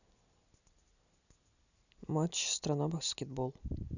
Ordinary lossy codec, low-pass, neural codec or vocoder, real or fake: none; 7.2 kHz; none; real